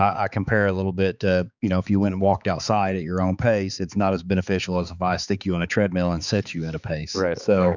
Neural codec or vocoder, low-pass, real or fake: codec, 16 kHz, 4 kbps, X-Codec, HuBERT features, trained on balanced general audio; 7.2 kHz; fake